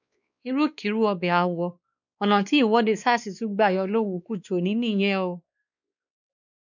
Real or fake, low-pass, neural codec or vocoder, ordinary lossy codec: fake; 7.2 kHz; codec, 16 kHz, 2 kbps, X-Codec, WavLM features, trained on Multilingual LibriSpeech; none